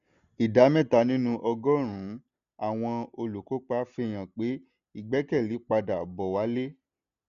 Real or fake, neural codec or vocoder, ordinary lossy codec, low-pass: real; none; none; 7.2 kHz